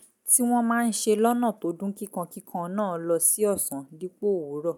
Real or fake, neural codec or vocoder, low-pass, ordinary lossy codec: real; none; 19.8 kHz; none